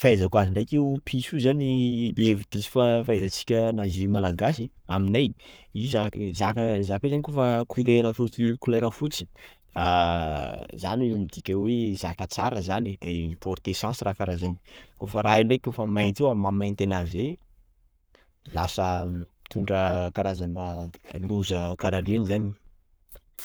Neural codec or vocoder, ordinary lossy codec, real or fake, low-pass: codec, 44.1 kHz, 7.8 kbps, Pupu-Codec; none; fake; none